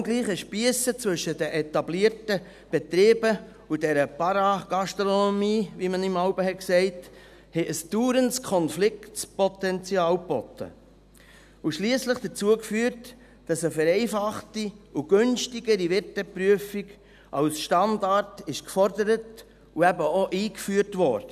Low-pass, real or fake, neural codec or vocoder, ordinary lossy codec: 14.4 kHz; real; none; none